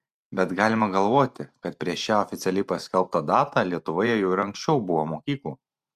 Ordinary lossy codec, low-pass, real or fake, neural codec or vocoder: Opus, 64 kbps; 14.4 kHz; fake; vocoder, 44.1 kHz, 128 mel bands every 512 samples, BigVGAN v2